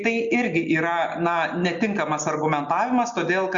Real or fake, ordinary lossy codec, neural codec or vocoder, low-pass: real; Opus, 64 kbps; none; 7.2 kHz